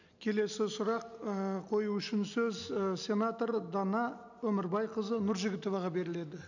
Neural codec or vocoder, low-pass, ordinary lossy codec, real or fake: none; 7.2 kHz; none; real